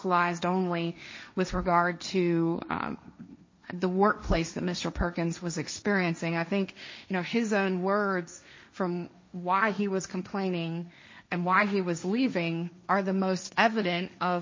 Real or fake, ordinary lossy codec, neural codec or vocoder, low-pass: fake; MP3, 32 kbps; codec, 16 kHz, 1.1 kbps, Voila-Tokenizer; 7.2 kHz